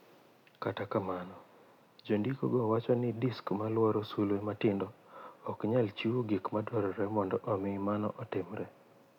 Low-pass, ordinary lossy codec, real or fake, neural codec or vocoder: 19.8 kHz; none; real; none